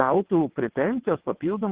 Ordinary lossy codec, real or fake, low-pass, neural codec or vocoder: Opus, 16 kbps; fake; 3.6 kHz; vocoder, 22.05 kHz, 80 mel bands, WaveNeXt